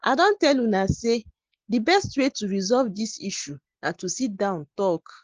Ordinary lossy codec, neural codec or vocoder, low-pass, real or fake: Opus, 16 kbps; none; 7.2 kHz; real